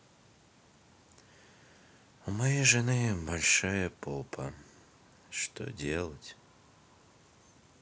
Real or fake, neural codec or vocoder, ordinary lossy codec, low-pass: real; none; none; none